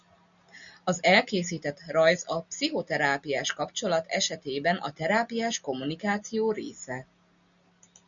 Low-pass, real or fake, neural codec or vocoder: 7.2 kHz; real; none